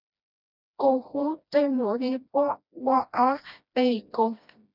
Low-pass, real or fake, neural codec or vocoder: 5.4 kHz; fake; codec, 16 kHz, 1 kbps, FreqCodec, smaller model